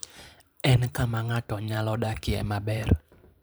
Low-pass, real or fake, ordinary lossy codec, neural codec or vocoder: none; fake; none; vocoder, 44.1 kHz, 128 mel bands every 512 samples, BigVGAN v2